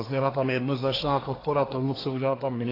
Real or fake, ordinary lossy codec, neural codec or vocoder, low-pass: fake; AAC, 24 kbps; codec, 24 kHz, 1 kbps, SNAC; 5.4 kHz